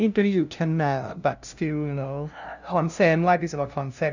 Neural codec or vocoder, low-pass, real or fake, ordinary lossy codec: codec, 16 kHz, 0.5 kbps, FunCodec, trained on LibriTTS, 25 frames a second; 7.2 kHz; fake; none